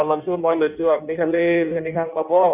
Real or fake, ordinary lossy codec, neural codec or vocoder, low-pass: fake; none; codec, 16 kHz in and 24 kHz out, 1.1 kbps, FireRedTTS-2 codec; 3.6 kHz